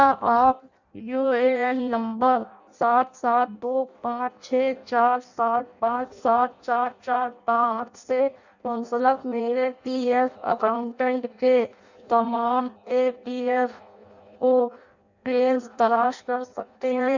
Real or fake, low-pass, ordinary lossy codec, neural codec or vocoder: fake; 7.2 kHz; none; codec, 16 kHz in and 24 kHz out, 0.6 kbps, FireRedTTS-2 codec